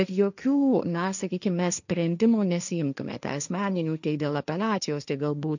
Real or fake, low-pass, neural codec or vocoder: fake; 7.2 kHz; codec, 16 kHz, 1.1 kbps, Voila-Tokenizer